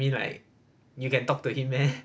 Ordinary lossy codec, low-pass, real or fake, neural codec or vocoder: none; none; real; none